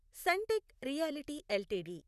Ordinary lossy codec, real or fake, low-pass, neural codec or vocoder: none; fake; 14.4 kHz; vocoder, 44.1 kHz, 128 mel bands, Pupu-Vocoder